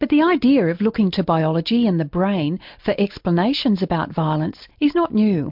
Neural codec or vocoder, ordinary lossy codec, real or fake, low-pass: none; MP3, 48 kbps; real; 5.4 kHz